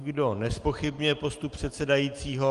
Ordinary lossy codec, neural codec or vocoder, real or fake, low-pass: Opus, 24 kbps; none; real; 10.8 kHz